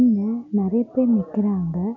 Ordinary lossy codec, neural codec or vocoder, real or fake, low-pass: none; none; real; 7.2 kHz